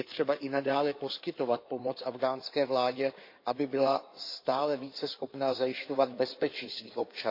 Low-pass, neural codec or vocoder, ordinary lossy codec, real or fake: 5.4 kHz; codec, 16 kHz in and 24 kHz out, 2.2 kbps, FireRedTTS-2 codec; MP3, 32 kbps; fake